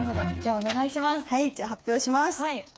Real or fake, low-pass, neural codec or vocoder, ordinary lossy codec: fake; none; codec, 16 kHz, 4 kbps, FreqCodec, smaller model; none